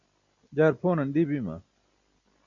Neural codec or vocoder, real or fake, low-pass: none; real; 7.2 kHz